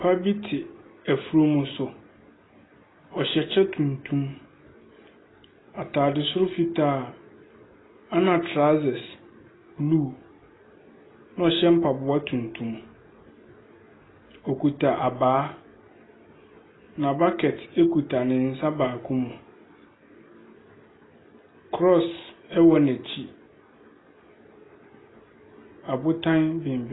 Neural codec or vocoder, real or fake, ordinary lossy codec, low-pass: none; real; AAC, 16 kbps; 7.2 kHz